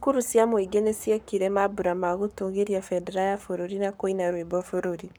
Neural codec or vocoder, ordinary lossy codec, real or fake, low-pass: codec, 44.1 kHz, 7.8 kbps, Pupu-Codec; none; fake; none